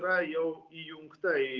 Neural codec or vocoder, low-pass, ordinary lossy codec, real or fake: none; 7.2 kHz; Opus, 24 kbps; real